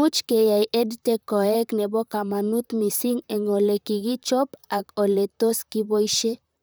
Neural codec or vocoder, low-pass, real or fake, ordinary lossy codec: vocoder, 44.1 kHz, 128 mel bands, Pupu-Vocoder; none; fake; none